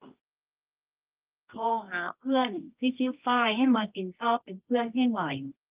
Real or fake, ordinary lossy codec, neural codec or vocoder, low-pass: fake; Opus, 32 kbps; codec, 24 kHz, 0.9 kbps, WavTokenizer, medium music audio release; 3.6 kHz